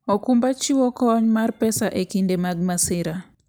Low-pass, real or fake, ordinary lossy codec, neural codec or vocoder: none; real; none; none